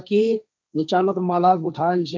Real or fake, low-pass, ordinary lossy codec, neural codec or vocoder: fake; none; none; codec, 16 kHz, 1.1 kbps, Voila-Tokenizer